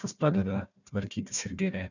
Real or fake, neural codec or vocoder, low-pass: fake; codec, 16 kHz, 1 kbps, FunCodec, trained on Chinese and English, 50 frames a second; 7.2 kHz